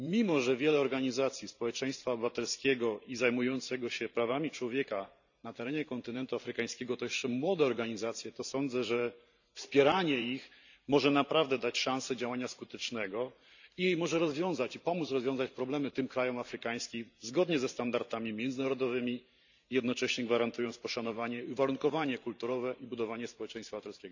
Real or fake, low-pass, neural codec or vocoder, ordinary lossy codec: fake; 7.2 kHz; vocoder, 44.1 kHz, 128 mel bands every 512 samples, BigVGAN v2; none